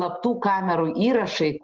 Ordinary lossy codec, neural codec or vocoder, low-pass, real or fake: Opus, 16 kbps; none; 7.2 kHz; real